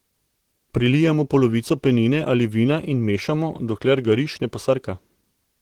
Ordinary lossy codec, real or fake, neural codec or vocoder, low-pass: Opus, 16 kbps; fake; vocoder, 44.1 kHz, 128 mel bands, Pupu-Vocoder; 19.8 kHz